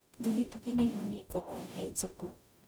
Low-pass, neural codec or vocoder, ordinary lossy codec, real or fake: none; codec, 44.1 kHz, 0.9 kbps, DAC; none; fake